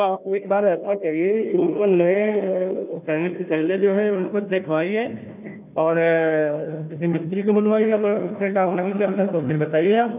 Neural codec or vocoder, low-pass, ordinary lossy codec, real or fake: codec, 16 kHz, 1 kbps, FunCodec, trained on Chinese and English, 50 frames a second; 3.6 kHz; none; fake